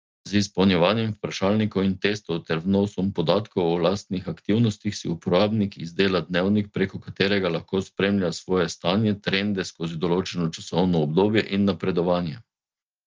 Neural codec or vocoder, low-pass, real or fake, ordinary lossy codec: none; 7.2 kHz; real; Opus, 24 kbps